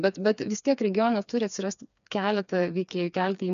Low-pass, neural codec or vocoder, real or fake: 7.2 kHz; codec, 16 kHz, 4 kbps, FreqCodec, smaller model; fake